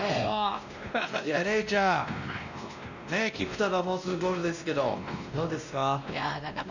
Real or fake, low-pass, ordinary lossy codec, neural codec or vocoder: fake; 7.2 kHz; none; codec, 16 kHz, 1 kbps, X-Codec, WavLM features, trained on Multilingual LibriSpeech